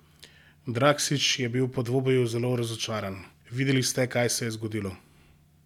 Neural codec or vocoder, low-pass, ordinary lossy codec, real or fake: none; 19.8 kHz; none; real